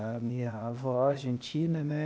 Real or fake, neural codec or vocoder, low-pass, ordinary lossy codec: fake; codec, 16 kHz, 0.8 kbps, ZipCodec; none; none